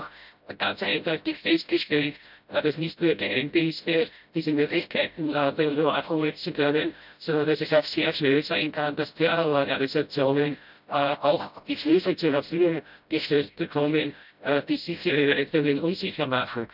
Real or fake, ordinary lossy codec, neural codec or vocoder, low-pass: fake; none; codec, 16 kHz, 0.5 kbps, FreqCodec, smaller model; 5.4 kHz